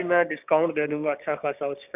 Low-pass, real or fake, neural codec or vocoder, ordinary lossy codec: 3.6 kHz; fake; codec, 16 kHz, 6 kbps, DAC; none